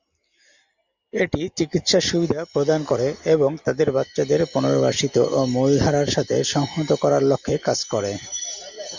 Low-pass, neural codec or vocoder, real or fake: 7.2 kHz; none; real